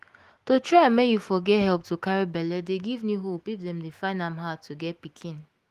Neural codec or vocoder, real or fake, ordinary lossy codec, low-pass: autoencoder, 48 kHz, 128 numbers a frame, DAC-VAE, trained on Japanese speech; fake; Opus, 24 kbps; 14.4 kHz